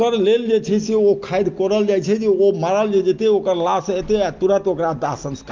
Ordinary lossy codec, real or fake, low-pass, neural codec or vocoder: Opus, 32 kbps; real; 7.2 kHz; none